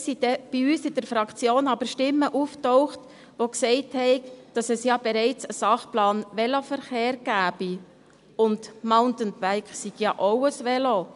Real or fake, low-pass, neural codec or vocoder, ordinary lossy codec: real; 10.8 kHz; none; none